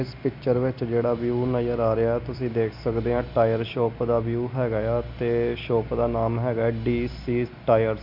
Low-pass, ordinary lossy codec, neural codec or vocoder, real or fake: 5.4 kHz; MP3, 48 kbps; none; real